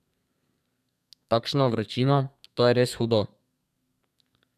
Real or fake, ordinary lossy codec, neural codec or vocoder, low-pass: fake; none; codec, 32 kHz, 1.9 kbps, SNAC; 14.4 kHz